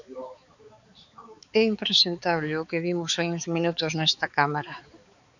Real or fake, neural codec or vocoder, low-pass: fake; codec, 16 kHz, 4 kbps, X-Codec, HuBERT features, trained on balanced general audio; 7.2 kHz